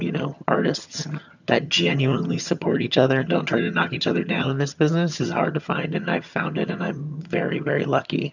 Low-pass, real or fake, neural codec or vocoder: 7.2 kHz; fake; vocoder, 22.05 kHz, 80 mel bands, HiFi-GAN